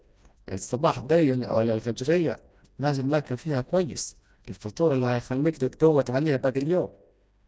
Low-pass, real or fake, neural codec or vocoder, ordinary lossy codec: none; fake; codec, 16 kHz, 1 kbps, FreqCodec, smaller model; none